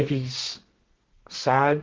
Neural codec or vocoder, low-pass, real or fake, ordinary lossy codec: codec, 32 kHz, 1.9 kbps, SNAC; 7.2 kHz; fake; Opus, 16 kbps